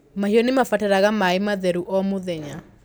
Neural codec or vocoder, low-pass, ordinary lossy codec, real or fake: none; none; none; real